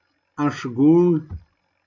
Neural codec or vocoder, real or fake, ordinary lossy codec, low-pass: none; real; AAC, 48 kbps; 7.2 kHz